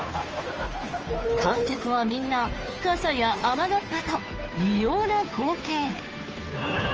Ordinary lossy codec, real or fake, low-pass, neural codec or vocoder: Opus, 24 kbps; fake; 7.2 kHz; codec, 16 kHz, 2 kbps, FunCodec, trained on Chinese and English, 25 frames a second